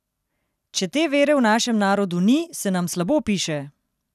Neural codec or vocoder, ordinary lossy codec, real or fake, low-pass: none; none; real; 14.4 kHz